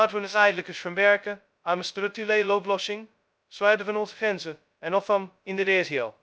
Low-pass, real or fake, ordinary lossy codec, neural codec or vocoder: none; fake; none; codec, 16 kHz, 0.2 kbps, FocalCodec